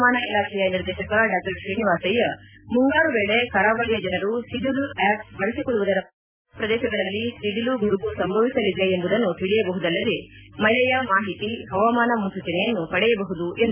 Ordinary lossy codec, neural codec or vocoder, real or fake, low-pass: none; none; real; 3.6 kHz